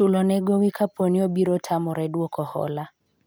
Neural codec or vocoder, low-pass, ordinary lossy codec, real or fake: none; none; none; real